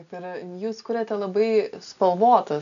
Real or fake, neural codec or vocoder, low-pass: real; none; 7.2 kHz